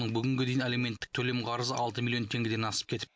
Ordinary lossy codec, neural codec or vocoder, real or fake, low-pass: none; none; real; none